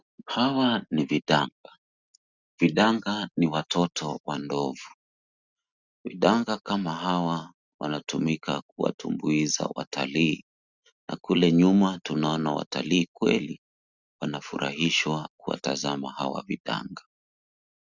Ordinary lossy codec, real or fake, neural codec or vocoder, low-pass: Opus, 64 kbps; real; none; 7.2 kHz